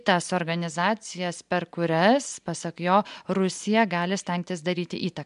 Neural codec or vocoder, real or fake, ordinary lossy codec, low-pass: none; real; MP3, 64 kbps; 10.8 kHz